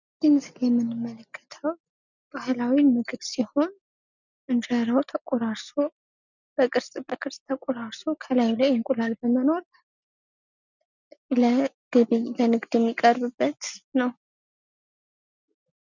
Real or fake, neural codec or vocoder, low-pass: real; none; 7.2 kHz